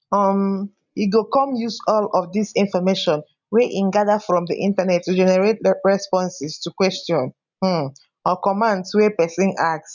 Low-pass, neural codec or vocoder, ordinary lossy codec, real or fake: 7.2 kHz; none; none; real